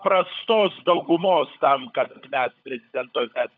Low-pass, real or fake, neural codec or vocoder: 7.2 kHz; fake; codec, 16 kHz, 16 kbps, FunCodec, trained on LibriTTS, 50 frames a second